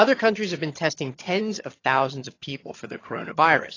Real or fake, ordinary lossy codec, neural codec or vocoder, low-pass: fake; AAC, 32 kbps; vocoder, 22.05 kHz, 80 mel bands, HiFi-GAN; 7.2 kHz